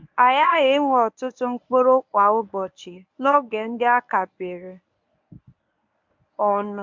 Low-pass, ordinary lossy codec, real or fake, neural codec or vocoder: 7.2 kHz; MP3, 64 kbps; fake; codec, 24 kHz, 0.9 kbps, WavTokenizer, medium speech release version 1